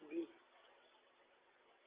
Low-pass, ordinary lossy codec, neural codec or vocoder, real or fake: 3.6 kHz; Opus, 32 kbps; none; real